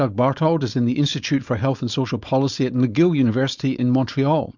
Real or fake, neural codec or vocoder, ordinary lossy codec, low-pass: fake; codec, 16 kHz, 4.8 kbps, FACodec; Opus, 64 kbps; 7.2 kHz